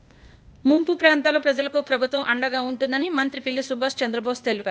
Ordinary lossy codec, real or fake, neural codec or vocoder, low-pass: none; fake; codec, 16 kHz, 0.8 kbps, ZipCodec; none